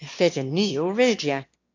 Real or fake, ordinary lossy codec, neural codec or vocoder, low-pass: fake; MP3, 48 kbps; autoencoder, 22.05 kHz, a latent of 192 numbers a frame, VITS, trained on one speaker; 7.2 kHz